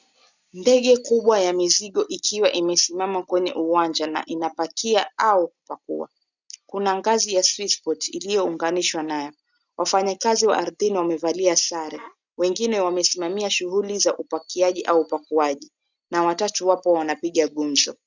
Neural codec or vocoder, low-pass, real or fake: none; 7.2 kHz; real